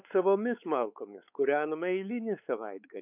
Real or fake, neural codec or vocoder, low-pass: fake; codec, 16 kHz, 4 kbps, X-Codec, WavLM features, trained on Multilingual LibriSpeech; 3.6 kHz